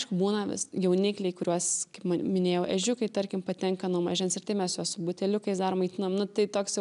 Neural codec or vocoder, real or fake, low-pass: none; real; 10.8 kHz